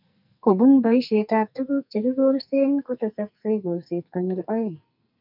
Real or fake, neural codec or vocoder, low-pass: fake; codec, 44.1 kHz, 2.6 kbps, SNAC; 5.4 kHz